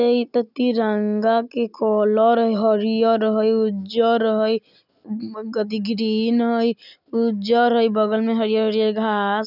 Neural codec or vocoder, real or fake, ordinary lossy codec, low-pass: none; real; none; 5.4 kHz